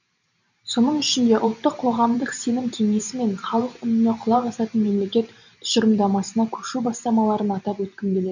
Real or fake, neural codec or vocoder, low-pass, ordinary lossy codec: fake; vocoder, 44.1 kHz, 128 mel bands every 512 samples, BigVGAN v2; 7.2 kHz; none